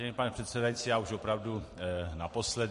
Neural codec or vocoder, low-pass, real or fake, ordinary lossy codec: vocoder, 44.1 kHz, 128 mel bands every 256 samples, BigVGAN v2; 14.4 kHz; fake; MP3, 48 kbps